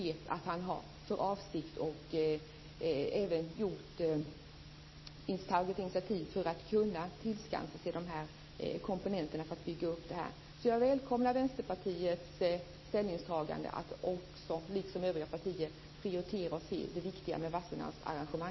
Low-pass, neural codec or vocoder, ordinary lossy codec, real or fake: 7.2 kHz; none; MP3, 24 kbps; real